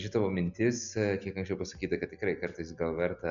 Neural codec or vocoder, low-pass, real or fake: none; 7.2 kHz; real